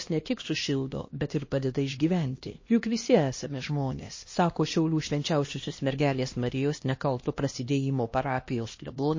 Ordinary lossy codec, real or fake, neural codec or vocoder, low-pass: MP3, 32 kbps; fake; codec, 16 kHz, 1 kbps, X-Codec, HuBERT features, trained on LibriSpeech; 7.2 kHz